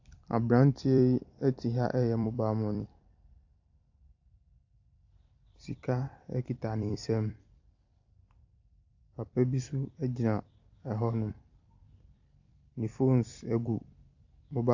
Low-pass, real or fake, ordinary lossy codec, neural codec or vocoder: 7.2 kHz; fake; AAC, 48 kbps; vocoder, 44.1 kHz, 128 mel bands every 256 samples, BigVGAN v2